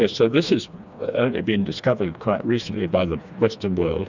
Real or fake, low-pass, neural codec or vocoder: fake; 7.2 kHz; codec, 16 kHz, 2 kbps, FreqCodec, smaller model